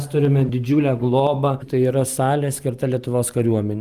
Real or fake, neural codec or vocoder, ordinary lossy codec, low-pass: real; none; Opus, 32 kbps; 14.4 kHz